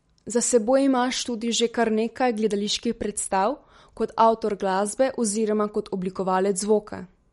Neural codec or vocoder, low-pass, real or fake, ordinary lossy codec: none; 19.8 kHz; real; MP3, 48 kbps